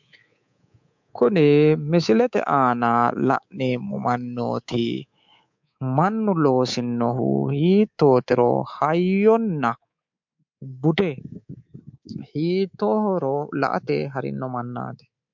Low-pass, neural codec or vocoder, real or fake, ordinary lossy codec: 7.2 kHz; codec, 24 kHz, 3.1 kbps, DualCodec; fake; MP3, 64 kbps